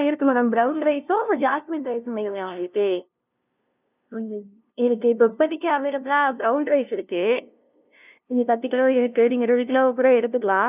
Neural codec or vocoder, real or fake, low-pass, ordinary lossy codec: codec, 16 kHz, 0.5 kbps, FunCodec, trained on LibriTTS, 25 frames a second; fake; 3.6 kHz; none